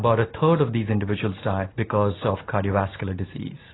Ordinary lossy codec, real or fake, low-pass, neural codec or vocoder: AAC, 16 kbps; real; 7.2 kHz; none